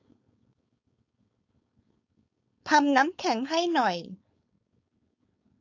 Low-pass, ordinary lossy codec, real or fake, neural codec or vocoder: 7.2 kHz; AAC, 48 kbps; fake; codec, 16 kHz, 4.8 kbps, FACodec